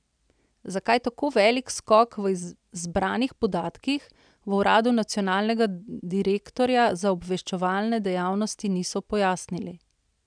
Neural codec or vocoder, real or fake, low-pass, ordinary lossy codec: none; real; 9.9 kHz; none